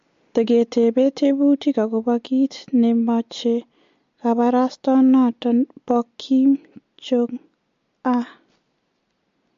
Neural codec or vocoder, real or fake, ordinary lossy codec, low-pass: none; real; MP3, 48 kbps; 7.2 kHz